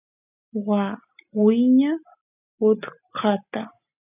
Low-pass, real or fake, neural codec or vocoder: 3.6 kHz; real; none